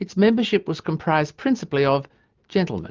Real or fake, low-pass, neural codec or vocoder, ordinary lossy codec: real; 7.2 kHz; none; Opus, 24 kbps